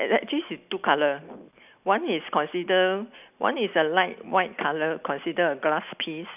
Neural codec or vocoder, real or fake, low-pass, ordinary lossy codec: none; real; 3.6 kHz; none